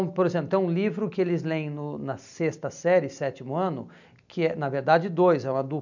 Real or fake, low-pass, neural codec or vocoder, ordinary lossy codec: real; 7.2 kHz; none; none